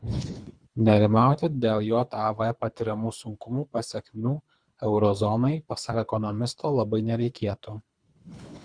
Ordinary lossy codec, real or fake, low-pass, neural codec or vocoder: Opus, 64 kbps; fake; 9.9 kHz; codec, 24 kHz, 3 kbps, HILCodec